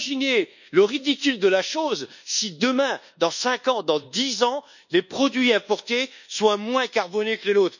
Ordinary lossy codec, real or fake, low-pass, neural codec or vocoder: none; fake; 7.2 kHz; codec, 24 kHz, 1.2 kbps, DualCodec